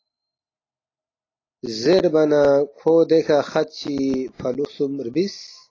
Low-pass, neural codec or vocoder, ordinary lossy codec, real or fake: 7.2 kHz; none; AAC, 32 kbps; real